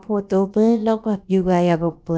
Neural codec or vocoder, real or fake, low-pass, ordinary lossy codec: codec, 16 kHz, about 1 kbps, DyCAST, with the encoder's durations; fake; none; none